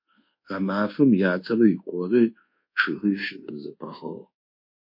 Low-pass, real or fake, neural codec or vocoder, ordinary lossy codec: 5.4 kHz; fake; codec, 24 kHz, 1.2 kbps, DualCodec; MP3, 32 kbps